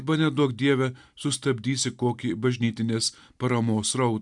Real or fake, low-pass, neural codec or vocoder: real; 10.8 kHz; none